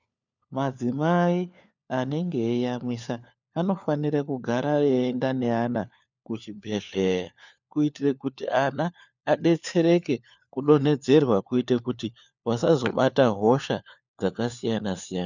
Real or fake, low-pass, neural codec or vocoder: fake; 7.2 kHz; codec, 16 kHz, 4 kbps, FunCodec, trained on LibriTTS, 50 frames a second